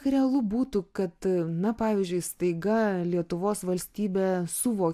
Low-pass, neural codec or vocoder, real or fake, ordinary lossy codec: 14.4 kHz; none; real; AAC, 96 kbps